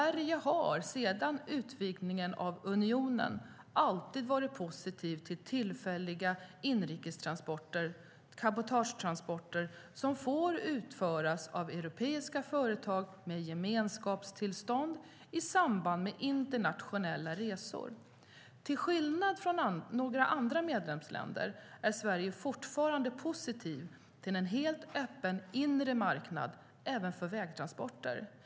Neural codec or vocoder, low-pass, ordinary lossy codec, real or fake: none; none; none; real